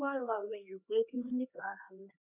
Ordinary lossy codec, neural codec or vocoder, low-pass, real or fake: none; codec, 16 kHz, 2 kbps, X-Codec, HuBERT features, trained on LibriSpeech; 3.6 kHz; fake